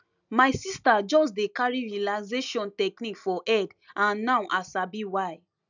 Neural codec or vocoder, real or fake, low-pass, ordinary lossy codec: none; real; 7.2 kHz; none